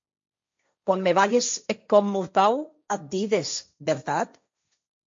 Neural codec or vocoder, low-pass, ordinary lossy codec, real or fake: codec, 16 kHz, 1.1 kbps, Voila-Tokenizer; 7.2 kHz; MP3, 48 kbps; fake